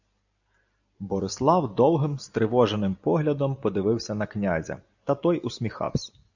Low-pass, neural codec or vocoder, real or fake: 7.2 kHz; none; real